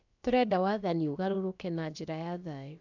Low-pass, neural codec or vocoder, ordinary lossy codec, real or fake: 7.2 kHz; codec, 16 kHz, about 1 kbps, DyCAST, with the encoder's durations; none; fake